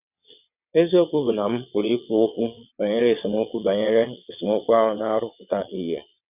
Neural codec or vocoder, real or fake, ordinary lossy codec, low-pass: vocoder, 22.05 kHz, 80 mel bands, WaveNeXt; fake; none; 3.6 kHz